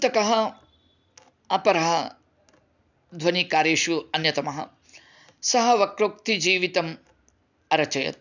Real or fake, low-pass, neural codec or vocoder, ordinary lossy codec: real; 7.2 kHz; none; none